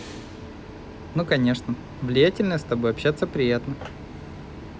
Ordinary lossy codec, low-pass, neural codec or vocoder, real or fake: none; none; none; real